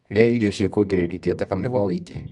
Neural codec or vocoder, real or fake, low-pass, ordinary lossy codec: codec, 24 kHz, 0.9 kbps, WavTokenizer, medium music audio release; fake; 10.8 kHz; none